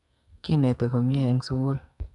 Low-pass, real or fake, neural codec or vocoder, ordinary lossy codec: 10.8 kHz; fake; codec, 44.1 kHz, 2.6 kbps, SNAC; none